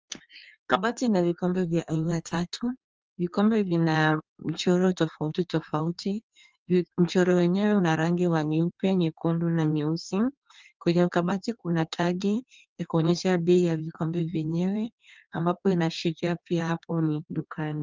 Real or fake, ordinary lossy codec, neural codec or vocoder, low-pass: fake; Opus, 24 kbps; codec, 16 kHz in and 24 kHz out, 1.1 kbps, FireRedTTS-2 codec; 7.2 kHz